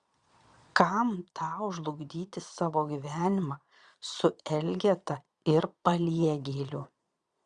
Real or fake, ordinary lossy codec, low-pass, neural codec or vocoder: fake; Opus, 64 kbps; 9.9 kHz; vocoder, 22.05 kHz, 80 mel bands, Vocos